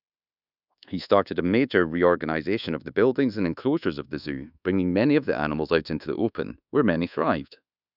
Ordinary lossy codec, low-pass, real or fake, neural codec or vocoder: none; 5.4 kHz; fake; codec, 24 kHz, 1.2 kbps, DualCodec